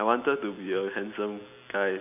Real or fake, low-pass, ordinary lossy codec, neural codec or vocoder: real; 3.6 kHz; none; none